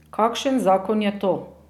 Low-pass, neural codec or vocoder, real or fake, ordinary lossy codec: 19.8 kHz; none; real; none